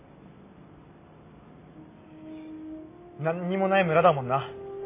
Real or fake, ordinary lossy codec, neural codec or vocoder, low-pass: real; MP3, 24 kbps; none; 3.6 kHz